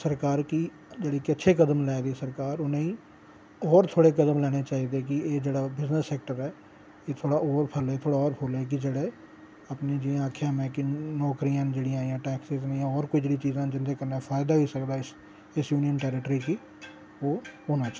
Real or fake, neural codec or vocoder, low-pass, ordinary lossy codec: real; none; none; none